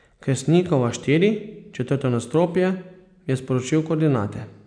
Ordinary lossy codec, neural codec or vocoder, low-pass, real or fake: none; none; 9.9 kHz; real